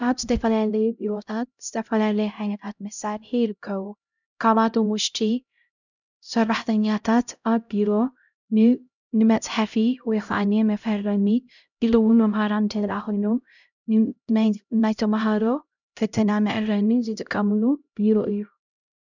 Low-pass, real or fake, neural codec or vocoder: 7.2 kHz; fake; codec, 16 kHz, 0.5 kbps, X-Codec, HuBERT features, trained on LibriSpeech